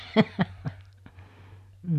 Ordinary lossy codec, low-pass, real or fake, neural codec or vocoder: none; 14.4 kHz; real; none